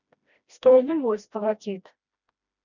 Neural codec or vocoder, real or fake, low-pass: codec, 16 kHz, 1 kbps, FreqCodec, smaller model; fake; 7.2 kHz